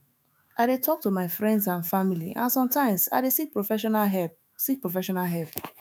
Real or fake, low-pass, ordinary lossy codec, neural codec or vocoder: fake; none; none; autoencoder, 48 kHz, 128 numbers a frame, DAC-VAE, trained on Japanese speech